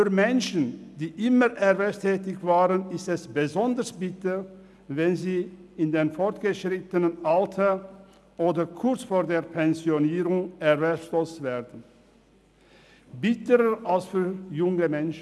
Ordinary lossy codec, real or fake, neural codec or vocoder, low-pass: none; real; none; none